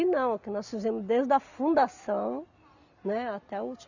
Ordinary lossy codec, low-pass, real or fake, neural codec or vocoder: none; 7.2 kHz; real; none